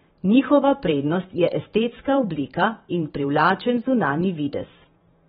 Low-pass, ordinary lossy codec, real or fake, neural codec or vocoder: 19.8 kHz; AAC, 16 kbps; fake; vocoder, 44.1 kHz, 128 mel bands every 512 samples, BigVGAN v2